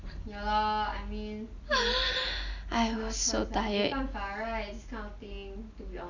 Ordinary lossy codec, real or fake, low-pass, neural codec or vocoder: none; real; 7.2 kHz; none